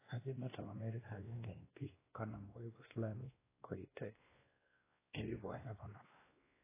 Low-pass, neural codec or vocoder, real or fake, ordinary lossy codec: 3.6 kHz; codec, 16 kHz, 1 kbps, X-Codec, WavLM features, trained on Multilingual LibriSpeech; fake; AAC, 16 kbps